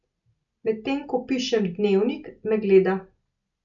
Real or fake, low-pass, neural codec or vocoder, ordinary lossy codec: real; 7.2 kHz; none; none